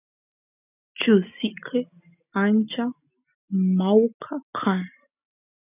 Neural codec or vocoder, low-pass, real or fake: none; 3.6 kHz; real